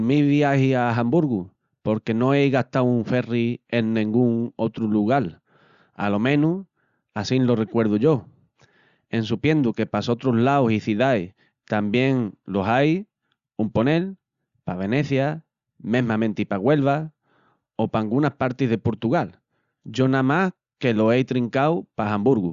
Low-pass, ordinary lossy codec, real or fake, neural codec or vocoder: 7.2 kHz; Opus, 64 kbps; real; none